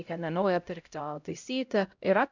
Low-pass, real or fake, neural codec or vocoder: 7.2 kHz; fake; codec, 16 kHz, 0.5 kbps, X-Codec, HuBERT features, trained on LibriSpeech